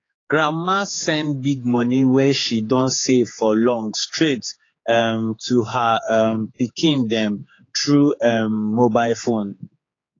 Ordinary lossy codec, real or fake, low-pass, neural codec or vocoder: AAC, 32 kbps; fake; 7.2 kHz; codec, 16 kHz, 4 kbps, X-Codec, HuBERT features, trained on general audio